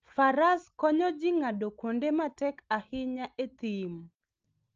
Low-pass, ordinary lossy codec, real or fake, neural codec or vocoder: 7.2 kHz; Opus, 24 kbps; real; none